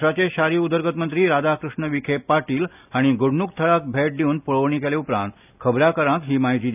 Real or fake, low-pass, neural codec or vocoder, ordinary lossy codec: real; 3.6 kHz; none; none